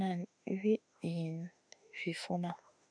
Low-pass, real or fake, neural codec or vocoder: 9.9 kHz; fake; autoencoder, 48 kHz, 32 numbers a frame, DAC-VAE, trained on Japanese speech